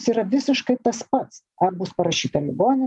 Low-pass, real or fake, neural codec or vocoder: 10.8 kHz; real; none